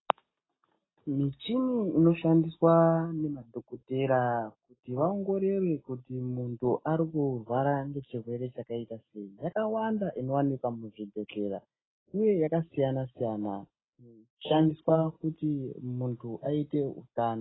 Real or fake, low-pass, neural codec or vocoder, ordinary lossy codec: real; 7.2 kHz; none; AAC, 16 kbps